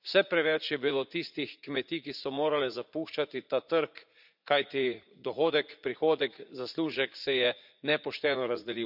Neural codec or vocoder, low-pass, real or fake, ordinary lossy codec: vocoder, 44.1 kHz, 80 mel bands, Vocos; 5.4 kHz; fake; none